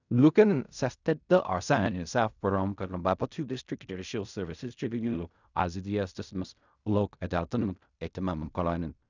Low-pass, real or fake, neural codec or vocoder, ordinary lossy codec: 7.2 kHz; fake; codec, 16 kHz in and 24 kHz out, 0.4 kbps, LongCat-Audio-Codec, fine tuned four codebook decoder; none